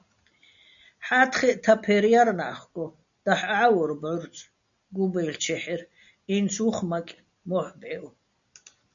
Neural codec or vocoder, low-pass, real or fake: none; 7.2 kHz; real